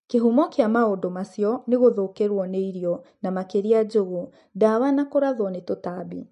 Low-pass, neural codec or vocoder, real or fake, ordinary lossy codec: 10.8 kHz; none; real; MP3, 48 kbps